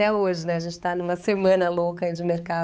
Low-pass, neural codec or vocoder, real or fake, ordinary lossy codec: none; codec, 16 kHz, 4 kbps, X-Codec, HuBERT features, trained on balanced general audio; fake; none